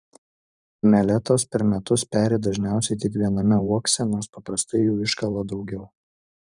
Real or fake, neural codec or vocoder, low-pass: fake; vocoder, 44.1 kHz, 128 mel bands every 512 samples, BigVGAN v2; 10.8 kHz